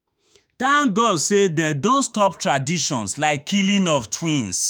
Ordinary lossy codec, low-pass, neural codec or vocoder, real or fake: none; none; autoencoder, 48 kHz, 32 numbers a frame, DAC-VAE, trained on Japanese speech; fake